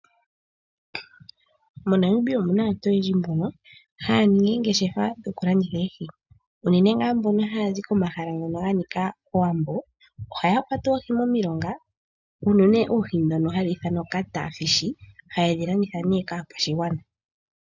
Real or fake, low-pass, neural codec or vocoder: real; 7.2 kHz; none